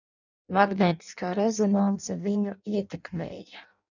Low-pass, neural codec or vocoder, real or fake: 7.2 kHz; codec, 16 kHz in and 24 kHz out, 0.6 kbps, FireRedTTS-2 codec; fake